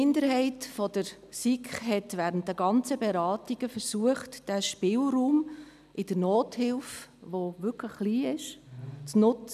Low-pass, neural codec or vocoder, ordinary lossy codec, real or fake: 14.4 kHz; none; none; real